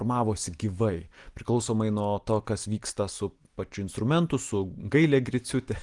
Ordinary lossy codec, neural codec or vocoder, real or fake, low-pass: Opus, 24 kbps; none; real; 10.8 kHz